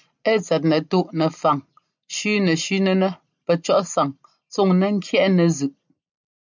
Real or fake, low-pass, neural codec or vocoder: real; 7.2 kHz; none